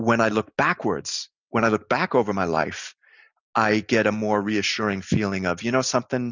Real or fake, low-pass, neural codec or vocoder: real; 7.2 kHz; none